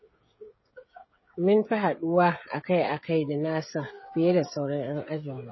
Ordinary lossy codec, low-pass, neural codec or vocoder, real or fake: MP3, 24 kbps; 7.2 kHz; codec, 16 kHz, 16 kbps, FreqCodec, smaller model; fake